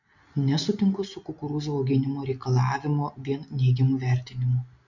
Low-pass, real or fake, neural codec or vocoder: 7.2 kHz; real; none